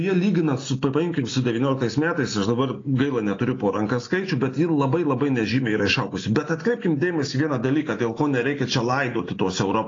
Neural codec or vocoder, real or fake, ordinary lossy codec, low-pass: none; real; AAC, 32 kbps; 7.2 kHz